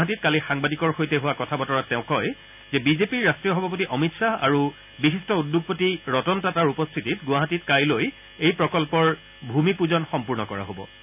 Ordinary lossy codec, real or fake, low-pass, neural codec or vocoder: none; real; 3.6 kHz; none